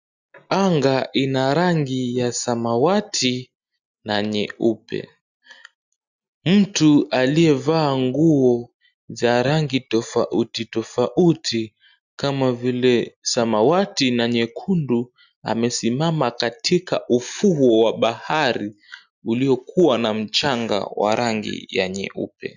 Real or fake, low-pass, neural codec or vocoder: real; 7.2 kHz; none